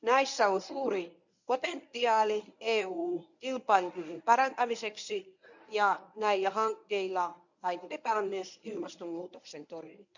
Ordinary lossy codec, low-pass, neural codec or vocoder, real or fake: none; 7.2 kHz; codec, 24 kHz, 0.9 kbps, WavTokenizer, medium speech release version 1; fake